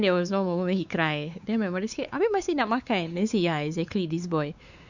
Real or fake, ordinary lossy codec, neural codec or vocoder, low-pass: fake; none; codec, 16 kHz, 4 kbps, X-Codec, WavLM features, trained on Multilingual LibriSpeech; 7.2 kHz